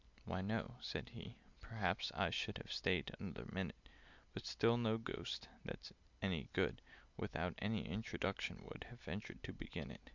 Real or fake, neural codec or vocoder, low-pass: real; none; 7.2 kHz